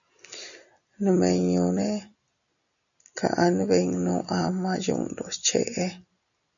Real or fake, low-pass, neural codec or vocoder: real; 7.2 kHz; none